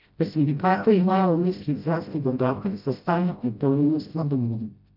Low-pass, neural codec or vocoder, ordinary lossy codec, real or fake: 5.4 kHz; codec, 16 kHz, 0.5 kbps, FreqCodec, smaller model; AAC, 48 kbps; fake